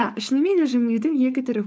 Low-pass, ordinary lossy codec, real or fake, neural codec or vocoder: none; none; fake; codec, 16 kHz, 4.8 kbps, FACodec